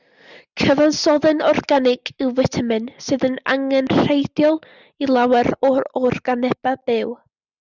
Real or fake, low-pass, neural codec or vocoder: real; 7.2 kHz; none